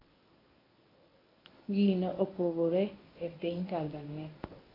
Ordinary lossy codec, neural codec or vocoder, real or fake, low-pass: AAC, 24 kbps; codec, 24 kHz, 0.9 kbps, WavTokenizer, medium speech release version 1; fake; 5.4 kHz